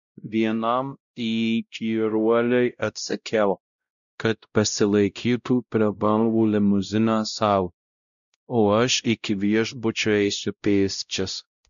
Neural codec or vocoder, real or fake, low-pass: codec, 16 kHz, 0.5 kbps, X-Codec, WavLM features, trained on Multilingual LibriSpeech; fake; 7.2 kHz